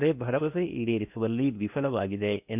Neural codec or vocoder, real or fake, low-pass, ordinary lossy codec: codec, 16 kHz in and 24 kHz out, 0.8 kbps, FocalCodec, streaming, 65536 codes; fake; 3.6 kHz; none